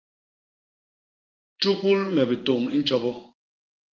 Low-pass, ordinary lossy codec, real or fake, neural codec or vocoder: 7.2 kHz; Opus, 32 kbps; fake; codec, 16 kHz in and 24 kHz out, 1 kbps, XY-Tokenizer